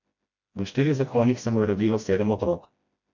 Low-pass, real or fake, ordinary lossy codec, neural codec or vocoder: 7.2 kHz; fake; MP3, 64 kbps; codec, 16 kHz, 1 kbps, FreqCodec, smaller model